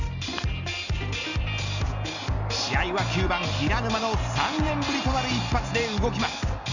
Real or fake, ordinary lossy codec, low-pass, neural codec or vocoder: real; none; 7.2 kHz; none